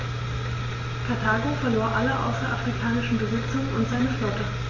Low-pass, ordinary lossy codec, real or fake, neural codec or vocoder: 7.2 kHz; MP3, 32 kbps; real; none